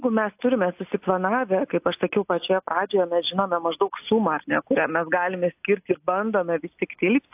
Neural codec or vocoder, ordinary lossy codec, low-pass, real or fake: none; AAC, 32 kbps; 3.6 kHz; real